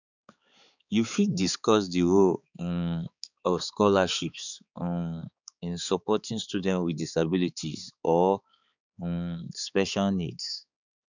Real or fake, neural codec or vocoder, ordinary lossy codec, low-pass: fake; codec, 16 kHz, 4 kbps, X-Codec, HuBERT features, trained on balanced general audio; none; 7.2 kHz